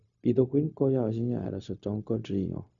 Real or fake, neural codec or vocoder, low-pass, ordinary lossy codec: fake; codec, 16 kHz, 0.4 kbps, LongCat-Audio-Codec; 7.2 kHz; none